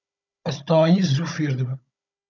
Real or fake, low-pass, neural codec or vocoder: fake; 7.2 kHz; codec, 16 kHz, 16 kbps, FunCodec, trained on Chinese and English, 50 frames a second